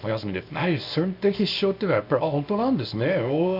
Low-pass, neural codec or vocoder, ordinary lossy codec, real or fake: 5.4 kHz; codec, 24 kHz, 0.9 kbps, WavTokenizer, small release; none; fake